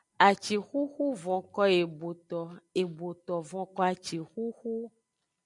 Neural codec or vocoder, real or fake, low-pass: none; real; 10.8 kHz